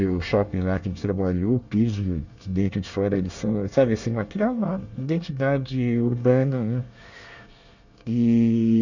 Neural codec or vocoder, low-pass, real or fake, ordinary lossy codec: codec, 24 kHz, 1 kbps, SNAC; 7.2 kHz; fake; none